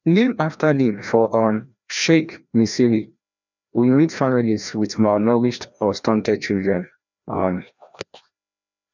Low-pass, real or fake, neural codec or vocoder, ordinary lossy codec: 7.2 kHz; fake; codec, 16 kHz, 1 kbps, FreqCodec, larger model; none